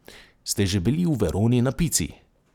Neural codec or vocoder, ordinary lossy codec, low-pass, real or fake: none; none; 19.8 kHz; real